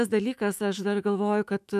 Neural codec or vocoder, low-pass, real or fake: autoencoder, 48 kHz, 128 numbers a frame, DAC-VAE, trained on Japanese speech; 14.4 kHz; fake